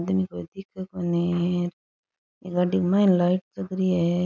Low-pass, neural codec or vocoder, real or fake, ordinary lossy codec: 7.2 kHz; none; real; none